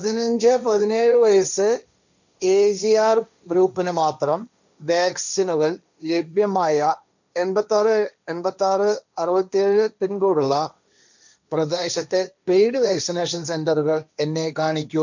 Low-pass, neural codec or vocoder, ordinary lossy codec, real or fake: 7.2 kHz; codec, 16 kHz, 1.1 kbps, Voila-Tokenizer; none; fake